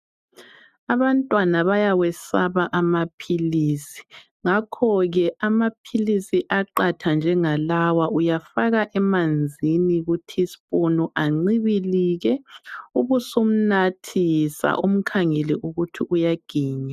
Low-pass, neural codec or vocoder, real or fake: 14.4 kHz; none; real